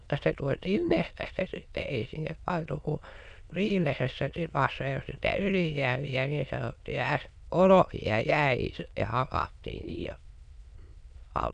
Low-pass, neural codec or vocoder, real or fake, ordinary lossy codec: 9.9 kHz; autoencoder, 22.05 kHz, a latent of 192 numbers a frame, VITS, trained on many speakers; fake; none